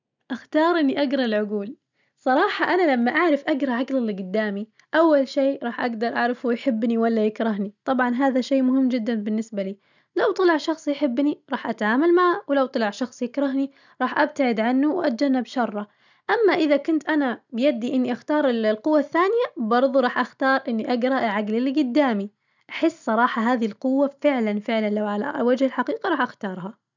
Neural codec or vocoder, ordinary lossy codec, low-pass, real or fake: none; none; 7.2 kHz; real